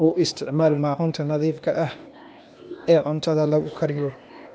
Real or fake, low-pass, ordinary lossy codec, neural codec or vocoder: fake; none; none; codec, 16 kHz, 0.8 kbps, ZipCodec